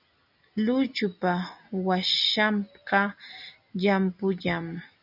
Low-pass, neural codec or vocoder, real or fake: 5.4 kHz; none; real